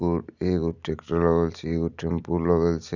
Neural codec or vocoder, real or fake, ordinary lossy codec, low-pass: none; real; none; 7.2 kHz